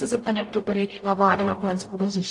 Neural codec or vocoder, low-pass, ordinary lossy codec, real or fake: codec, 44.1 kHz, 0.9 kbps, DAC; 10.8 kHz; AAC, 48 kbps; fake